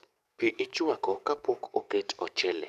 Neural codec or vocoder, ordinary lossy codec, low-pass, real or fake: codec, 44.1 kHz, 7.8 kbps, DAC; none; 14.4 kHz; fake